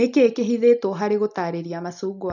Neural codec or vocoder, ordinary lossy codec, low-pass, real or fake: none; none; 7.2 kHz; real